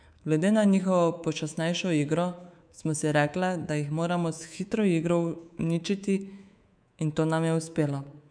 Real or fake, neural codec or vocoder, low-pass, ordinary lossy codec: fake; codec, 24 kHz, 3.1 kbps, DualCodec; 9.9 kHz; none